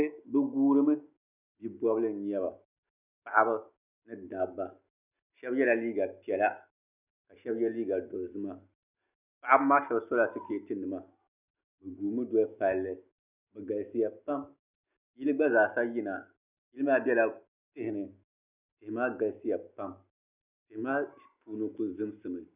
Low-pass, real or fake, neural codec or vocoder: 3.6 kHz; real; none